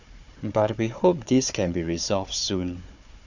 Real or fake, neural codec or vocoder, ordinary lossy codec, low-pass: fake; codec, 16 kHz, 4 kbps, FunCodec, trained on Chinese and English, 50 frames a second; none; 7.2 kHz